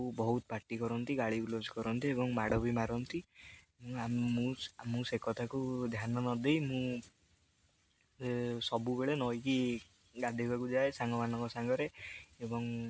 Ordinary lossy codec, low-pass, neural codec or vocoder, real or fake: none; none; none; real